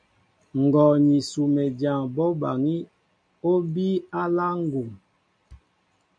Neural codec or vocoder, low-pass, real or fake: none; 9.9 kHz; real